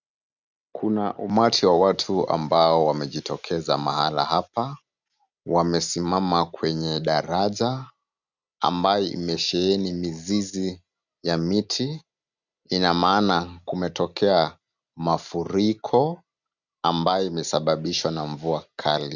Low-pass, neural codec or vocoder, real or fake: 7.2 kHz; none; real